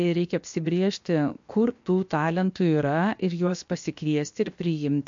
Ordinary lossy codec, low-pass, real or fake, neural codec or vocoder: MP3, 48 kbps; 7.2 kHz; fake; codec, 16 kHz, 0.7 kbps, FocalCodec